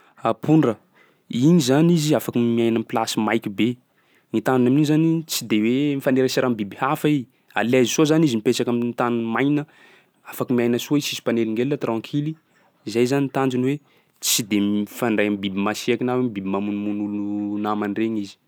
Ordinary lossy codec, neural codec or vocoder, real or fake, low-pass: none; none; real; none